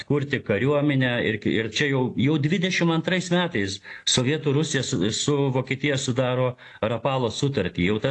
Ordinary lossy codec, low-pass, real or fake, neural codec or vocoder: AAC, 48 kbps; 10.8 kHz; real; none